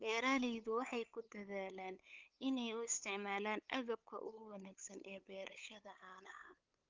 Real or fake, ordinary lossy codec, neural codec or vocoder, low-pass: fake; Opus, 24 kbps; codec, 16 kHz, 8 kbps, FunCodec, trained on LibriTTS, 25 frames a second; 7.2 kHz